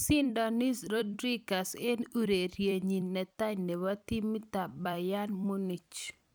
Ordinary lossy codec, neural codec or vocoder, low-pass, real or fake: none; vocoder, 44.1 kHz, 128 mel bands every 256 samples, BigVGAN v2; none; fake